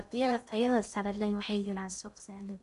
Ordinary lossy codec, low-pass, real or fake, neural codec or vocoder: none; 10.8 kHz; fake; codec, 16 kHz in and 24 kHz out, 0.6 kbps, FocalCodec, streaming, 2048 codes